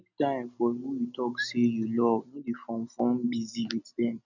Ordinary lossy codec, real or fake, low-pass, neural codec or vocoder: none; real; 7.2 kHz; none